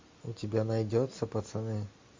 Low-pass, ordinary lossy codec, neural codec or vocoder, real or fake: 7.2 kHz; MP3, 48 kbps; none; real